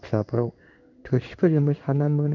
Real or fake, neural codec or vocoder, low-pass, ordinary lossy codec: fake; codec, 16 kHz in and 24 kHz out, 1 kbps, XY-Tokenizer; 7.2 kHz; none